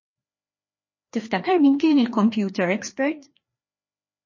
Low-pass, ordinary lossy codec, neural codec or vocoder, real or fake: 7.2 kHz; MP3, 32 kbps; codec, 16 kHz, 2 kbps, FreqCodec, larger model; fake